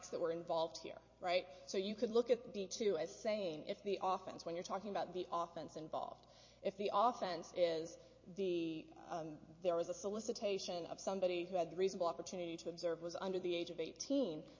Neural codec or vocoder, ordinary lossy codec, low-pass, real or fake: vocoder, 44.1 kHz, 128 mel bands every 256 samples, BigVGAN v2; MP3, 32 kbps; 7.2 kHz; fake